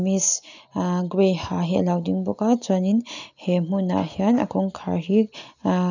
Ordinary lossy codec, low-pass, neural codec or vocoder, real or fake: none; 7.2 kHz; none; real